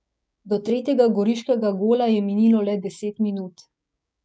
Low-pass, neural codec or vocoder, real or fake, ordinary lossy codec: none; codec, 16 kHz, 6 kbps, DAC; fake; none